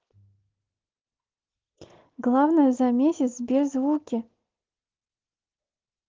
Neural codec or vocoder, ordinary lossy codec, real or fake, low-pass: none; Opus, 16 kbps; real; 7.2 kHz